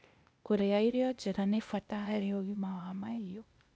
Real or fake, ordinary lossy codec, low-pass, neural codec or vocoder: fake; none; none; codec, 16 kHz, 0.8 kbps, ZipCodec